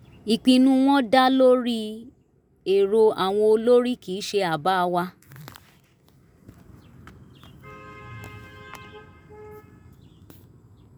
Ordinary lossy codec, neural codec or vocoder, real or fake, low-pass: none; none; real; none